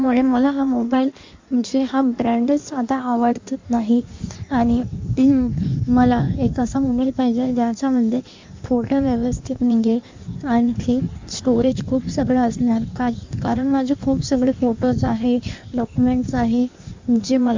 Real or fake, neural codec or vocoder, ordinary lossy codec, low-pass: fake; codec, 16 kHz in and 24 kHz out, 1.1 kbps, FireRedTTS-2 codec; MP3, 64 kbps; 7.2 kHz